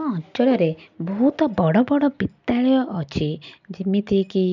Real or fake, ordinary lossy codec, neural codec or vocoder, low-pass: real; none; none; 7.2 kHz